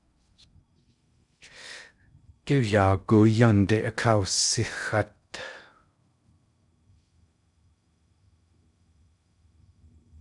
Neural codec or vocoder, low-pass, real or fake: codec, 16 kHz in and 24 kHz out, 0.6 kbps, FocalCodec, streaming, 4096 codes; 10.8 kHz; fake